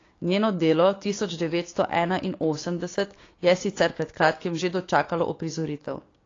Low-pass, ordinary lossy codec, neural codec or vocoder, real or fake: 7.2 kHz; AAC, 32 kbps; codec, 16 kHz, 6 kbps, DAC; fake